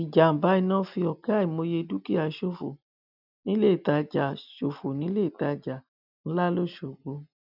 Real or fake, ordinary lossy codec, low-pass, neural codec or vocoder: real; none; 5.4 kHz; none